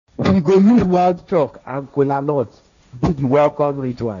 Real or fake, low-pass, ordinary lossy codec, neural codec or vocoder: fake; 7.2 kHz; none; codec, 16 kHz, 1.1 kbps, Voila-Tokenizer